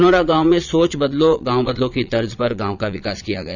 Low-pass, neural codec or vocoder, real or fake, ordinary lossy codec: 7.2 kHz; vocoder, 22.05 kHz, 80 mel bands, Vocos; fake; none